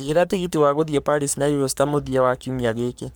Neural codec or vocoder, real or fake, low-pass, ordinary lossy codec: codec, 44.1 kHz, 3.4 kbps, Pupu-Codec; fake; none; none